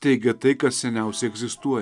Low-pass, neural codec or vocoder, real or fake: 10.8 kHz; none; real